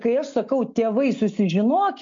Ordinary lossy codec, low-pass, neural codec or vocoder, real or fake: MP3, 48 kbps; 7.2 kHz; none; real